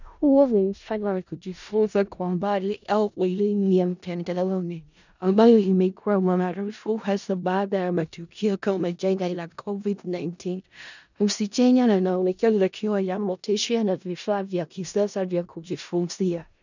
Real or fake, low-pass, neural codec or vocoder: fake; 7.2 kHz; codec, 16 kHz in and 24 kHz out, 0.4 kbps, LongCat-Audio-Codec, four codebook decoder